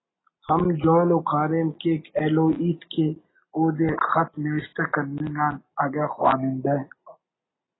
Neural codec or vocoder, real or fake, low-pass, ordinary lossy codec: none; real; 7.2 kHz; AAC, 16 kbps